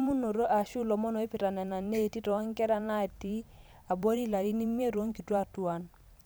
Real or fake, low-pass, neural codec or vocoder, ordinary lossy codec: fake; none; vocoder, 44.1 kHz, 128 mel bands every 512 samples, BigVGAN v2; none